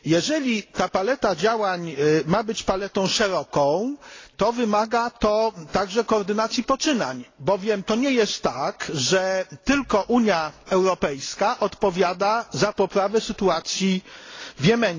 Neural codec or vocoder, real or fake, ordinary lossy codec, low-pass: none; real; AAC, 32 kbps; 7.2 kHz